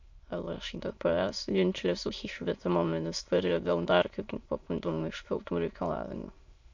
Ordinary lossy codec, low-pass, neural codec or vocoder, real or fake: MP3, 48 kbps; 7.2 kHz; autoencoder, 22.05 kHz, a latent of 192 numbers a frame, VITS, trained on many speakers; fake